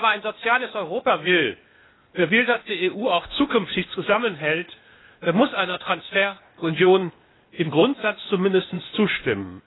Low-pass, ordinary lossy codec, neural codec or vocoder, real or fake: 7.2 kHz; AAC, 16 kbps; codec, 16 kHz, 0.8 kbps, ZipCodec; fake